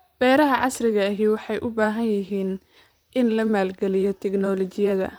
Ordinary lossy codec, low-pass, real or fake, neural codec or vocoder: none; none; fake; vocoder, 44.1 kHz, 128 mel bands, Pupu-Vocoder